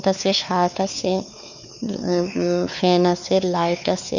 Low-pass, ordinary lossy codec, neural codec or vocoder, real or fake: 7.2 kHz; none; codec, 16 kHz, 4 kbps, X-Codec, WavLM features, trained on Multilingual LibriSpeech; fake